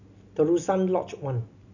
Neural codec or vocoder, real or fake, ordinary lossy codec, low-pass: none; real; none; 7.2 kHz